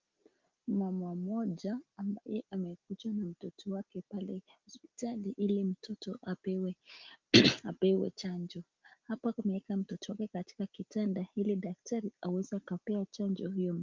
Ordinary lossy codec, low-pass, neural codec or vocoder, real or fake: Opus, 32 kbps; 7.2 kHz; none; real